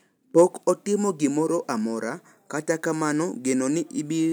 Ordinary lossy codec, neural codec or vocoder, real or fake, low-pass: none; none; real; none